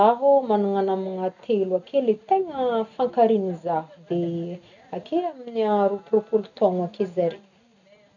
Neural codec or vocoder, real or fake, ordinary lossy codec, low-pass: none; real; none; 7.2 kHz